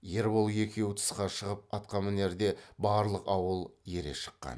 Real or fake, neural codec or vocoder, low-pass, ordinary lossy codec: real; none; none; none